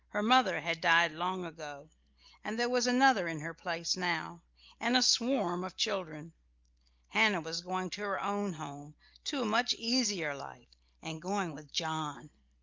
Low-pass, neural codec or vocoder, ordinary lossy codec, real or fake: 7.2 kHz; none; Opus, 32 kbps; real